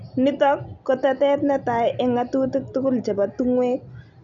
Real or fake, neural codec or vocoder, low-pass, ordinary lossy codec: real; none; 7.2 kHz; none